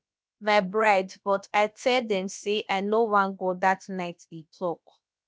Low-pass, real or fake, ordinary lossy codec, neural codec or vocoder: none; fake; none; codec, 16 kHz, about 1 kbps, DyCAST, with the encoder's durations